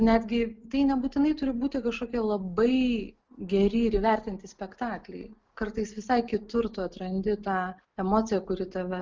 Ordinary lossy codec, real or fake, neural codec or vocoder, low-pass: Opus, 24 kbps; real; none; 7.2 kHz